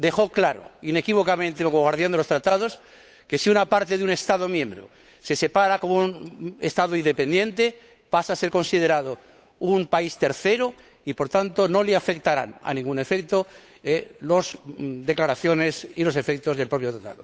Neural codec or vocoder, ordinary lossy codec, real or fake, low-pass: codec, 16 kHz, 8 kbps, FunCodec, trained on Chinese and English, 25 frames a second; none; fake; none